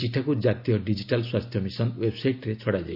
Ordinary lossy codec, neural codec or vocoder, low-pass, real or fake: AAC, 48 kbps; none; 5.4 kHz; real